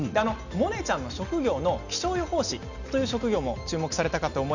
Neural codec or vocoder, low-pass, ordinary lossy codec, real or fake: none; 7.2 kHz; none; real